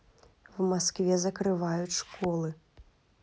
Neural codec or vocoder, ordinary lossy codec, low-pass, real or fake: none; none; none; real